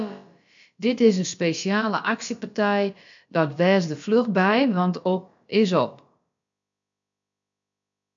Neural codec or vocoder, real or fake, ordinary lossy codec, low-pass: codec, 16 kHz, about 1 kbps, DyCAST, with the encoder's durations; fake; MP3, 64 kbps; 7.2 kHz